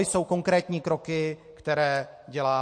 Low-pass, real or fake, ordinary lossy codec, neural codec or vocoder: 9.9 kHz; real; MP3, 48 kbps; none